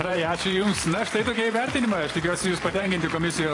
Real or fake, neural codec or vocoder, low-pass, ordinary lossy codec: fake; vocoder, 44.1 kHz, 128 mel bands every 512 samples, BigVGAN v2; 10.8 kHz; AAC, 32 kbps